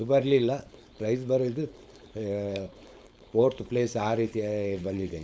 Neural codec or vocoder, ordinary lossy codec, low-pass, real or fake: codec, 16 kHz, 4.8 kbps, FACodec; none; none; fake